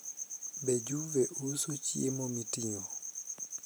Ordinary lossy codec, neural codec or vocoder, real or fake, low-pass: none; none; real; none